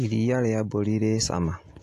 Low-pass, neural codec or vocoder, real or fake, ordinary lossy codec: 14.4 kHz; none; real; AAC, 48 kbps